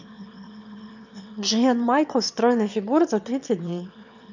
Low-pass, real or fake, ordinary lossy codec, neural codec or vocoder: 7.2 kHz; fake; none; autoencoder, 22.05 kHz, a latent of 192 numbers a frame, VITS, trained on one speaker